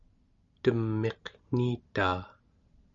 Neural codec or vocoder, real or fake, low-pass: none; real; 7.2 kHz